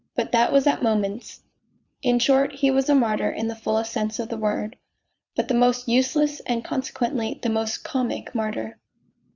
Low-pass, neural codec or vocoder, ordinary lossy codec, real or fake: 7.2 kHz; vocoder, 22.05 kHz, 80 mel bands, Vocos; Opus, 64 kbps; fake